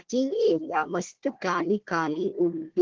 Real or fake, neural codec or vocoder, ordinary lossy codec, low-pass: fake; codec, 16 kHz, 1 kbps, FunCodec, trained on Chinese and English, 50 frames a second; Opus, 16 kbps; 7.2 kHz